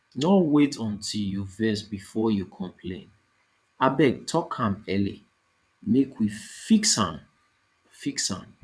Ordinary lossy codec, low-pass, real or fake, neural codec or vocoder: none; none; fake; vocoder, 22.05 kHz, 80 mel bands, WaveNeXt